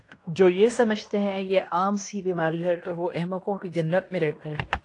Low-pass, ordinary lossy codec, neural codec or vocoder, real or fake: 10.8 kHz; AAC, 48 kbps; codec, 16 kHz in and 24 kHz out, 0.9 kbps, LongCat-Audio-Codec, fine tuned four codebook decoder; fake